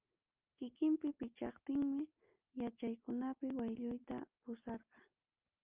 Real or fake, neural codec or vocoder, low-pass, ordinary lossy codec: real; none; 3.6 kHz; Opus, 24 kbps